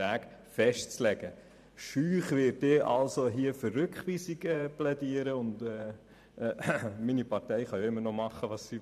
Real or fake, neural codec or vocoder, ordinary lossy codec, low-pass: fake; vocoder, 44.1 kHz, 128 mel bands every 512 samples, BigVGAN v2; none; 14.4 kHz